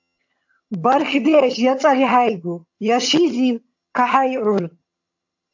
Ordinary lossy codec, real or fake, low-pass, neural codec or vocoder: AAC, 48 kbps; fake; 7.2 kHz; vocoder, 22.05 kHz, 80 mel bands, HiFi-GAN